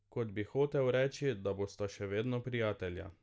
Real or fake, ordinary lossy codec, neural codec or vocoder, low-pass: real; none; none; none